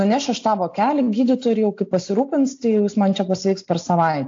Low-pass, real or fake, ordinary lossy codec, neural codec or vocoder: 7.2 kHz; real; AAC, 48 kbps; none